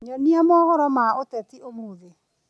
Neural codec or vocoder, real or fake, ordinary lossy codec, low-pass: none; real; none; none